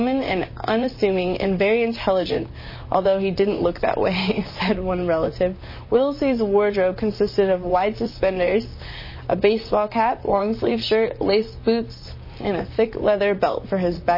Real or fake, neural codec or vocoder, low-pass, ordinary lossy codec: fake; vocoder, 44.1 kHz, 128 mel bands, Pupu-Vocoder; 5.4 kHz; MP3, 24 kbps